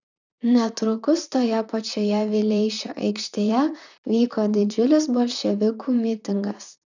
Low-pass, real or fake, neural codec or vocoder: 7.2 kHz; fake; vocoder, 44.1 kHz, 128 mel bands, Pupu-Vocoder